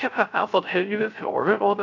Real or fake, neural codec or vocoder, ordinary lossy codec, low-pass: fake; codec, 16 kHz, 0.3 kbps, FocalCodec; none; 7.2 kHz